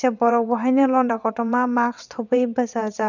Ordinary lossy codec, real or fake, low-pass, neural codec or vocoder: none; fake; 7.2 kHz; vocoder, 22.05 kHz, 80 mel bands, WaveNeXt